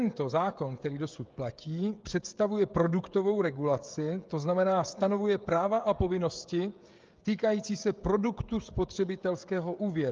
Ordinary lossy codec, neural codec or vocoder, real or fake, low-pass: Opus, 32 kbps; codec, 16 kHz, 16 kbps, FreqCodec, smaller model; fake; 7.2 kHz